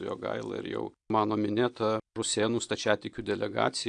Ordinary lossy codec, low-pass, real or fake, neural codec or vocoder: AAC, 64 kbps; 9.9 kHz; real; none